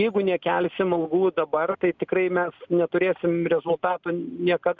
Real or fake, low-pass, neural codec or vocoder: real; 7.2 kHz; none